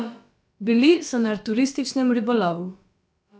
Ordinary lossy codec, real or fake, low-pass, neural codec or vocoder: none; fake; none; codec, 16 kHz, about 1 kbps, DyCAST, with the encoder's durations